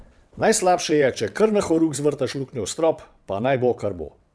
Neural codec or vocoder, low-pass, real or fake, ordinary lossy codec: vocoder, 22.05 kHz, 80 mel bands, WaveNeXt; none; fake; none